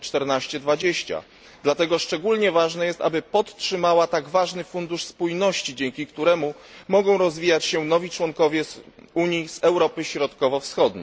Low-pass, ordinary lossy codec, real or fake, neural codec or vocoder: none; none; real; none